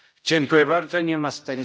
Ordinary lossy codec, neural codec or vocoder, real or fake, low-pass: none; codec, 16 kHz, 0.5 kbps, X-Codec, HuBERT features, trained on general audio; fake; none